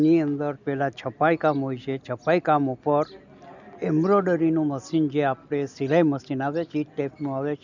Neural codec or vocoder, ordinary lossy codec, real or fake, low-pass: none; none; real; 7.2 kHz